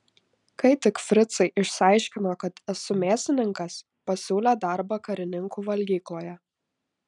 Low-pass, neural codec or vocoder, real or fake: 10.8 kHz; none; real